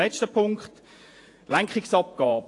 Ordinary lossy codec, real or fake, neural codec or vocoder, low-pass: AAC, 48 kbps; fake; vocoder, 48 kHz, 128 mel bands, Vocos; 10.8 kHz